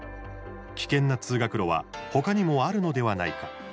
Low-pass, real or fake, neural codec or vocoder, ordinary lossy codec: none; real; none; none